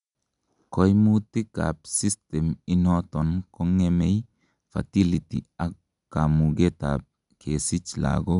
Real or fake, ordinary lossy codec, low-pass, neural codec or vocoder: real; none; 10.8 kHz; none